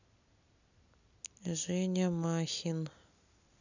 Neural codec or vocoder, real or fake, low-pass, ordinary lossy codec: none; real; 7.2 kHz; none